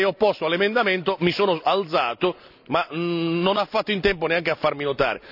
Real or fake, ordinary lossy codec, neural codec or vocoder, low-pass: real; none; none; 5.4 kHz